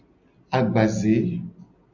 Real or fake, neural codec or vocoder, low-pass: real; none; 7.2 kHz